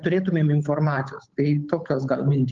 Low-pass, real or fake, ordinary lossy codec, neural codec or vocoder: 7.2 kHz; fake; Opus, 32 kbps; codec, 16 kHz, 8 kbps, FunCodec, trained on Chinese and English, 25 frames a second